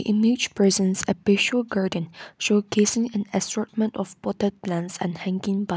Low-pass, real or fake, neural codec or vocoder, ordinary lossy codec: none; real; none; none